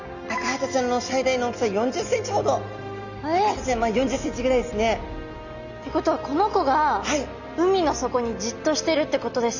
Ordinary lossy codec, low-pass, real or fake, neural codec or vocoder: none; 7.2 kHz; real; none